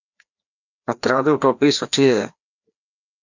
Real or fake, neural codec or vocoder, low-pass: fake; codec, 16 kHz, 1 kbps, FreqCodec, larger model; 7.2 kHz